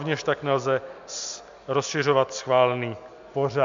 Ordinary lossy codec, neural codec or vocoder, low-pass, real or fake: MP3, 64 kbps; none; 7.2 kHz; real